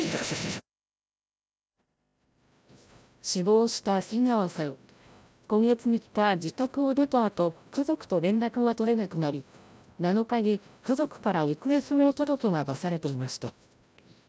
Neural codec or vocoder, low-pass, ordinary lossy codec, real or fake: codec, 16 kHz, 0.5 kbps, FreqCodec, larger model; none; none; fake